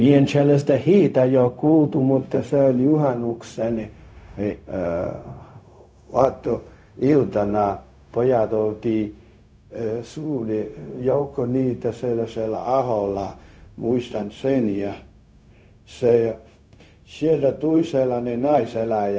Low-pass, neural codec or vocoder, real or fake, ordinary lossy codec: none; codec, 16 kHz, 0.4 kbps, LongCat-Audio-Codec; fake; none